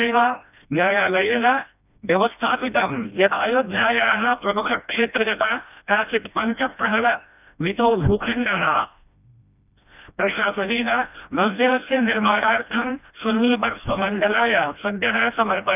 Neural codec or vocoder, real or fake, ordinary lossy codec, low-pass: codec, 16 kHz, 1 kbps, FreqCodec, smaller model; fake; none; 3.6 kHz